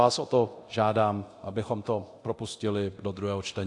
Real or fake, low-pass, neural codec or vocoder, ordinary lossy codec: fake; 10.8 kHz; codec, 24 kHz, 0.9 kbps, DualCodec; AAC, 48 kbps